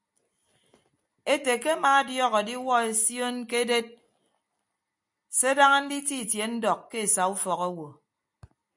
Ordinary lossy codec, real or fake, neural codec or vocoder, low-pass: MP3, 96 kbps; real; none; 10.8 kHz